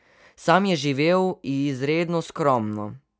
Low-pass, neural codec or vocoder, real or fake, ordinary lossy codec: none; none; real; none